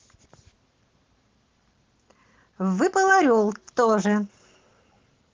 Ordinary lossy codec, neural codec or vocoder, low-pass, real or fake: Opus, 16 kbps; none; 7.2 kHz; real